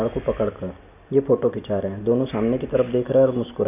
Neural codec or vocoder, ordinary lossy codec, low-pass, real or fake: none; none; 3.6 kHz; real